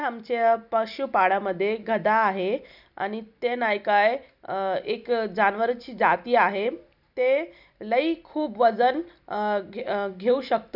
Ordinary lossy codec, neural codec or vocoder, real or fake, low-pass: none; none; real; 5.4 kHz